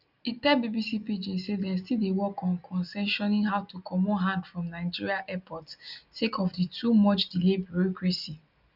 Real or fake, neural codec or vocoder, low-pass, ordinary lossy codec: real; none; 5.4 kHz; none